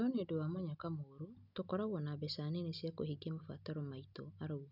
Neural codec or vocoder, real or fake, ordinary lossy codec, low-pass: none; real; none; 5.4 kHz